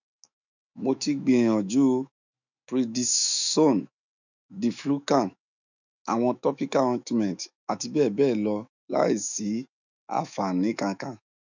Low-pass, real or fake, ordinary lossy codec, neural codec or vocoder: 7.2 kHz; fake; none; autoencoder, 48 kHz, 128 numbers a frame, DAC-VAE, trained on Japanese speech